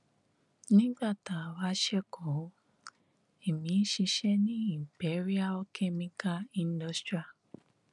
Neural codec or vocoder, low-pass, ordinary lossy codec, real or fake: none; 10.8 kHz; none; real